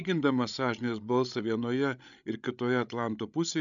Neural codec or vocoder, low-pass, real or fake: codec, 16 kHz, 16 kbps, FreqCodec, larger model; 7.2 kHz; fake